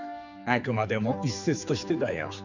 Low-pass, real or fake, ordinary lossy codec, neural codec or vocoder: 7.2 kHz; fake; none; codec, 16 kHz, 4 kbps, X-Codec, HuBERT features, trained on general audio